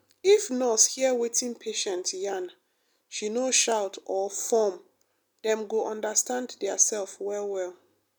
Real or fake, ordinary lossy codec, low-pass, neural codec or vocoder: real; none; none; none